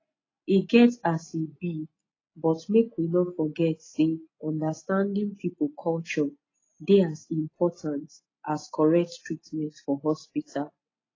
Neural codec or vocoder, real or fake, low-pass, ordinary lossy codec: none; real; 7.2 kHz; AAC, 32 kbps